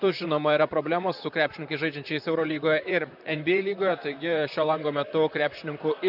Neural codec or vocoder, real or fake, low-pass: vocoder, 44.1 kHz, 128 mel bands, Pupu-Vocoder; fake; 5.4 kHz